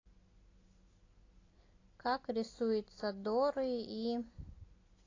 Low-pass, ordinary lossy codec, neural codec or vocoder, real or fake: 7.2 kHz; AAC, 32 kbps; none; real